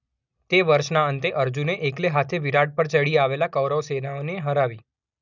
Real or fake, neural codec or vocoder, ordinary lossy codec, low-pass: real; none; none; 7.2 kHz